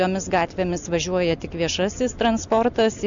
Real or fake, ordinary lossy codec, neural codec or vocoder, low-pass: real; MP3, 96 kbps; none; 7.2 kHz